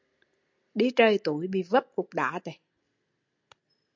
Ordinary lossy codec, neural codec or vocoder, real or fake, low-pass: MP3, 64 kbps; none; real; 7.2 kHz